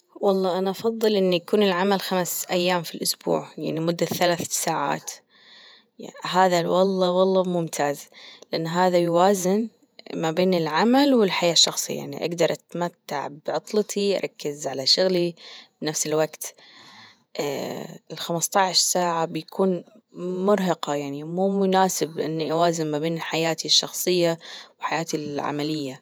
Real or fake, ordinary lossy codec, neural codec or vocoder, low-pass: fake; none; vocoder, 48 kHz, 128 mel bands, Vocos; none